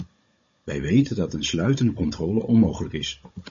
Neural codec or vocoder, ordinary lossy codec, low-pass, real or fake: codec, 16 kHz, 16 kbps, FunCodec, trained on Chinese and English, 50 frames a second; MP3, 32 kbps; 7.2 kHz; fake